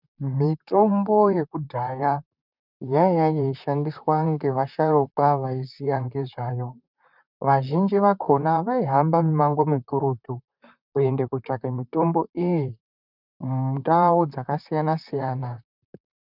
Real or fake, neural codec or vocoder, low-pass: fake; vocoder, 44.1 kHz, 128 mel bands, Pupu-Vocoder; 5.4 kHz